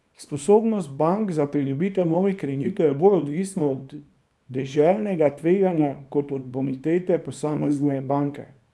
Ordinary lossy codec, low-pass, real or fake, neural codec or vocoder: none; none; fake; codec, 24 kHz, 0.9 kbps, WavTokenizer, small release